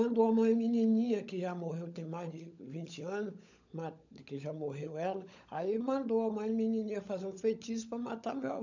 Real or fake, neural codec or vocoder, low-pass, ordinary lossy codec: fake; codec, 16 kHz, 16 kbps, FunCodec, trained on LibriTTS, 50 frames a second; 7.2 kHz; none